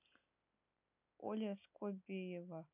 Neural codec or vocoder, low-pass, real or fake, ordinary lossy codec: none; 3.6 kHz; real; none